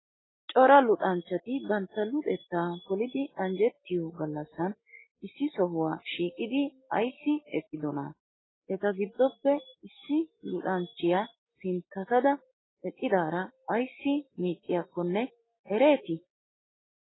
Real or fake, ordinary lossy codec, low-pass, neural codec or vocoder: real; AAC, 16 kbps; 7.2 kHz; none